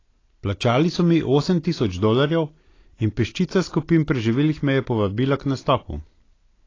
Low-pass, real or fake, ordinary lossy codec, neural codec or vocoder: 7.2 kHz; real; AAC, 32 kbps; none